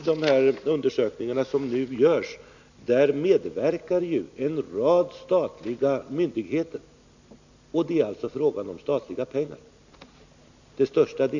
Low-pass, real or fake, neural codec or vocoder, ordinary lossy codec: 7.2 kHz; real; none; none